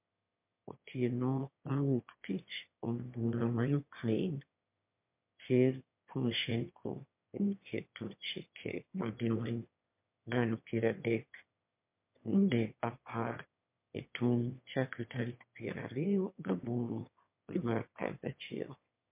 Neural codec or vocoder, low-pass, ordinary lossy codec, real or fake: autoencoder, 22.05 kHz, a latent of 192 numbers a frame, VITS, trained on one speaker; 3.6 kHz; MP3, 32 kbps; fake